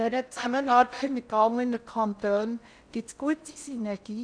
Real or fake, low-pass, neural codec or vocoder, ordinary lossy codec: fake; 9.9 kHz; codec, 16 kHz in and 24 kHz out, 0.6 kbps, FocalCodec, streaming, 4096 codes; none